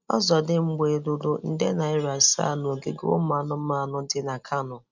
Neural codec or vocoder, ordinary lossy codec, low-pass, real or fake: none; none; 7.2 kHz; real